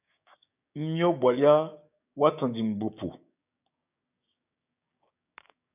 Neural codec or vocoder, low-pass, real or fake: codec, 16 kHz, 6 kbps, DAC; 3.6 kHz; fake